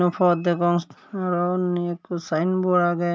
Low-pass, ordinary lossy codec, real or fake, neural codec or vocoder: none; none; real; none